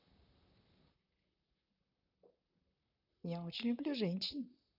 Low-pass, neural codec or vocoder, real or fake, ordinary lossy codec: 5.4 kHz; vocoder, 44.1 kHz, 128 mel bands, Pupu-Vocoder; fake; none